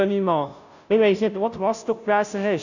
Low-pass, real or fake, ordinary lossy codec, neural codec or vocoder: 7.2 kHz; fake; none; codec, 16 kHz, 0.5 kbps, FunCodec, trained on Chinese and English, 25 frames a second